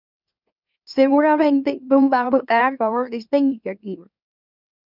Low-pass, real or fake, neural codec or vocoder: 5.4 kHz; fake; autoencoder, 44.1 kHz, a latent of 192 numbers a frame, MeloTTS